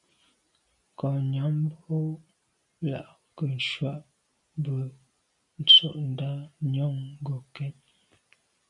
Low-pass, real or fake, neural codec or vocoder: 10.8 kHz; real; none